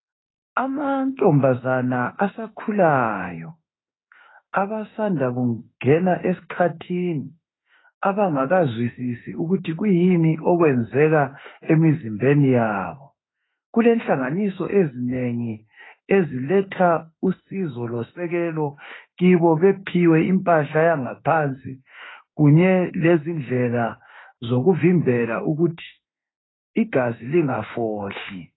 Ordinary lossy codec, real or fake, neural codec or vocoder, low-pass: AAC, 16 kbps; fake; autoencoder, 48 kHz, 32 numbers a frame, DAC-VAE, trained on Japanese speech; 7.2 kHz